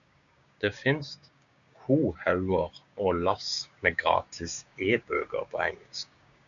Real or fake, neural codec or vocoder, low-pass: fake; codec, 16 kHz, 6 kbps, DAC; 7.2 kHz